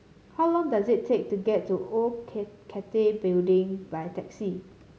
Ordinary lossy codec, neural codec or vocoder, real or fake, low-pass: none; none; real; none